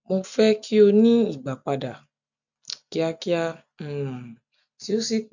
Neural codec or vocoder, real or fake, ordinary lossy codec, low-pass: none; real; none; 7.2 kHz